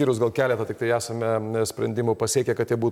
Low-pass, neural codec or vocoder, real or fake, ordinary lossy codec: 19.8 kHz; none; real; MP3, 96 kbps